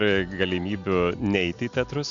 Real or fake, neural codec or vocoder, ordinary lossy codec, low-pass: real; none; AAC, 64 kbps; 7.2 kHz